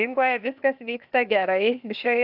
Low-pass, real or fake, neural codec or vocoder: 5.4 kHz; fake; codec, 16 kHz, 0.8 kbps, ZipCodec